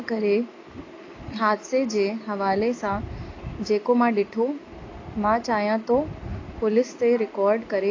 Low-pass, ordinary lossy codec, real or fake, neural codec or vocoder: 7.2 kHz; AAC, 32 kbps; real; none